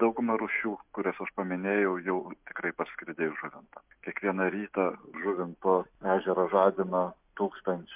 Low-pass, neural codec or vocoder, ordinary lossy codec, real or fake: 3.6 kHz; none; MP3, 32 kbps; real